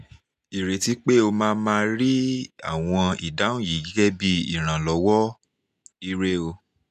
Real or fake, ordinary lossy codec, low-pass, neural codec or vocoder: real; none; 14.4 kHz; none